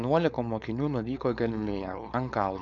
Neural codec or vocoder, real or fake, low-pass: codec, 16 kHz, 4.8 kbps, FACodec; fake; 7.2 kHz